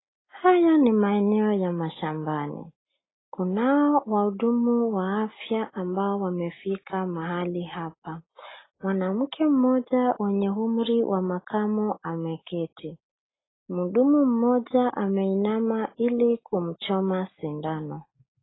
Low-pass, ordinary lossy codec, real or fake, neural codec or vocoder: 7.2 kHz; AAC, 16 kbps; real; none